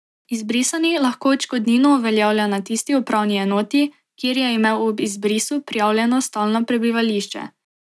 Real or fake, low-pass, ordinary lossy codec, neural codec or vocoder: real; none; none; none